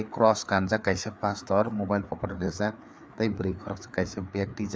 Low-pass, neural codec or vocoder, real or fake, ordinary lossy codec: none; codec, 16 kHz, 4 kbps, FreqCodec, larger model; fake; none